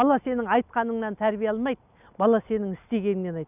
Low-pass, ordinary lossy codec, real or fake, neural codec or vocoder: 3.6 kHz; none; real; none